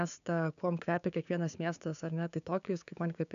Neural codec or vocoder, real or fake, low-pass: codec, 16 kHz, 6 kbps, DAC; fake; 7.2 kHz